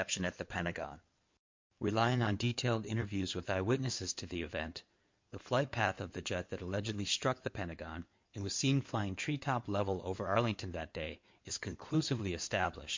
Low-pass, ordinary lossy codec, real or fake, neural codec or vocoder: 7.2 kHz; MP3, 48 kbps; fake; codec, 16 kHz in and 24 kHz out, 2.2 kbps, FireRedTTS-2 codec